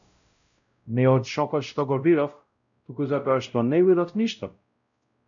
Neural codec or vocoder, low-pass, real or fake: codec, 16 kHz, 0.5 kbps, X-Codec, WavLM features, trained on Multilingual LibriSpeech; 7.2 kHz; fake